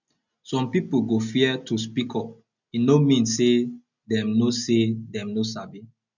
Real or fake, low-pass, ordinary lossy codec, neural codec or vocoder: real; 7.2 kHz; none; none